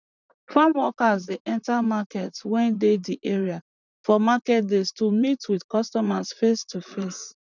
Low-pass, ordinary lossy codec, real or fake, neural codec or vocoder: 7.2 kHz; none; fake; vocoder, 44.1 kHz, 128 mel bands, Pupu-Vocoder